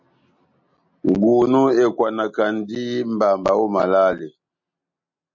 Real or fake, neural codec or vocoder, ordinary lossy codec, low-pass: real; none; MP3, 48 kbps; 7.2 kHz